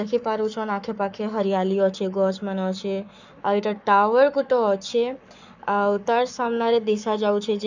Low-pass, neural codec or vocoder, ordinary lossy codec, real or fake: 7.2 kHz; codec, 44.1 kHz, 7.8 kbps, Pupu-Codec; none; fake